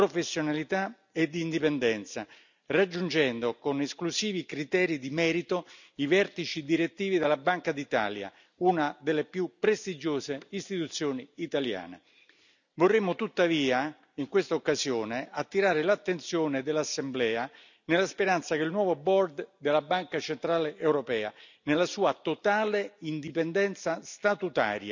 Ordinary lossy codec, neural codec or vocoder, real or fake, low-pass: none; none; real; 7.2 kHz